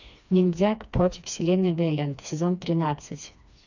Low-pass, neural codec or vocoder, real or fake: 7.2 kHz; codec, 16 kHz, 2 kbps, FreqCodec, smaller model; fake